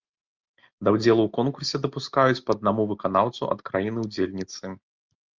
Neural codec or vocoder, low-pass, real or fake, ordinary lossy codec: none; 7.2 kHz; real; Opus, 24 kbps